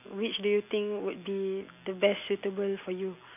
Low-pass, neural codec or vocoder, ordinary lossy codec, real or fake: 3.6 kHz; none; none; real